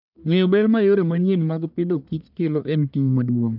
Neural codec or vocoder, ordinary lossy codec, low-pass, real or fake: codec, 44.1 kHz, 1.7 kbps, Pupu-Codec; none; 5.4 kHz; fake